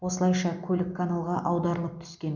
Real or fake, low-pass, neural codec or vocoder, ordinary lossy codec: real; 7.2 kHz; none; none